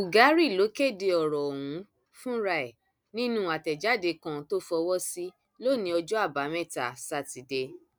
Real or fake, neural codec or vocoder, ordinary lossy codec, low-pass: real; none; none; none